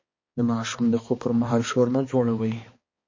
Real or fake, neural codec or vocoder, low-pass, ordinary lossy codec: fake; codec, 16 kHz, 2 kbps, X-Codec, HuBERT features, trained on balanced general audio; 7.2 kHz; MP3, 32 kbps